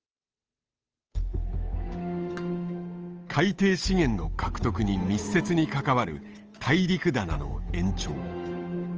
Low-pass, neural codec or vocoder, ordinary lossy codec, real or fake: 7.2 kHz; codec, 16 kHz, 8 kbps, FunCodec, trained on Chinese and English, 25 frames a second; Opus, 24 kbps; fake